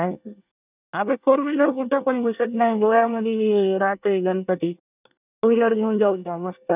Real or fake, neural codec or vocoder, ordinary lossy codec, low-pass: fake; codec, 24 kHz, 1 kbps, SNAC; none; 3.6 kHz